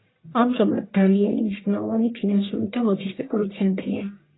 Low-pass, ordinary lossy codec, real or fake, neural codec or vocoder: 7.2 kHz; AAC, 16 kbps; fake; codec, 44.1 kHz, 1.7 kbps, Pupu-Codec